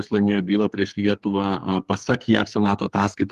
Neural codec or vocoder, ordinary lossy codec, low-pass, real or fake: codec, 32 kHz, 1.9 kbps, SNAC; Opus, 24 kbps; 14.4 kHz; fake